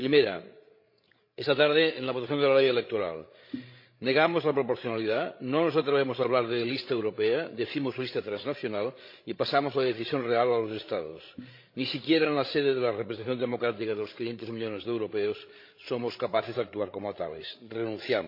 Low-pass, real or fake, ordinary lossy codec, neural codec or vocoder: 5.4 kHz; fake; MP3, 32 kbps; codec, 16 kHz, 16 kbps, FreqCodec, larger model